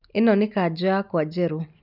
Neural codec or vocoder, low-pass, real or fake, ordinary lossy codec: none; 5.4 kHz; real; none